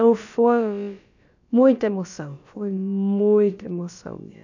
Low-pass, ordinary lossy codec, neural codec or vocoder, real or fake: 7.2 kHz; none; codec, 16 kHz, about 1 kbps, DyCAST, with the encoder's durations; fake